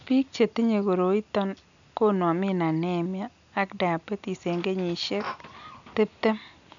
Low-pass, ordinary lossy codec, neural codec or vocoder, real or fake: 7.2 kHz; none; none; real